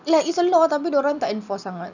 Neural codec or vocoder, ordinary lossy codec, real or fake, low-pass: none; none; real; 7.2 kHz